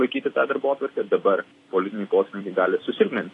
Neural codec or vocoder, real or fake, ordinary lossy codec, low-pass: vocoder, 44.1 kHz, 128 mel bands every 256 samples, BigVGAN v2; fake; AAC, 32 kbps; 10.8 kHz